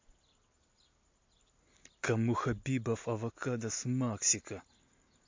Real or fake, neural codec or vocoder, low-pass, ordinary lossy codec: real; none; 7.2 kHz; MP3, 48 kbps